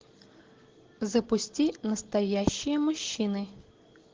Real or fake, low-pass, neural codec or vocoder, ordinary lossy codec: real; 7.2 kHz; none; Opus, 16 kbps